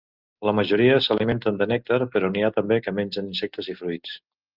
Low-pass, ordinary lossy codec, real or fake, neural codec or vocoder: 5.4 kHz; Opus, 32 kbps; real; none